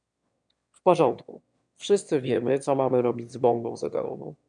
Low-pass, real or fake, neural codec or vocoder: 9.9 kHz; fake; autoencoder, 22.05 kHz, a latent of 192 numbers a frame, VITS, trained on one speaker